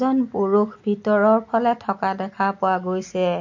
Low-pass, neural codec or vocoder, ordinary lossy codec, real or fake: 7.2 kHz; none; MP3, 48 kbps; real